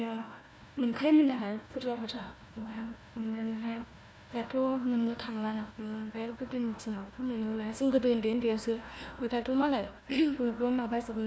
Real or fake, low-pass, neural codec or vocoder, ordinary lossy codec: fake; none; codec, 16 kHz, 1 kbps, FunCodec, trained on Chinese and English, 50 frames a second; none